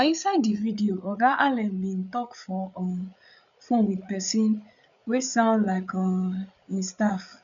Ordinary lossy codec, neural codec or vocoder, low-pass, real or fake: none; codec, 16 kHz, 8 kbps, FreqCodec, larger model; 7.2 kHz; fake